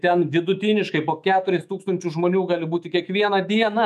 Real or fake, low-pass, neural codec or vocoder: fake; 14.4 kHz; autoencoder, 48 kHz, 128 numbers a frame, DAC-VAE, trained on Japanese speech